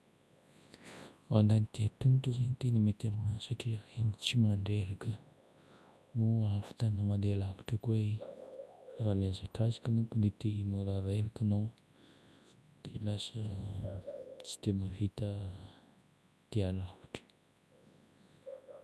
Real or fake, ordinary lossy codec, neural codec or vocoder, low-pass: fake; none; codec, 24 kHz, 0.9 kbps, WavTokenizer, large speech release; none